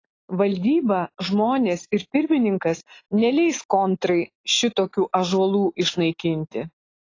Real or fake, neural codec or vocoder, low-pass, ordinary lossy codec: real; none; 7.2 kHz; AAC, 32 kbps